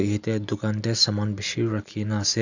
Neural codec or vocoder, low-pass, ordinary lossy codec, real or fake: none; 7.2 kHz; none; real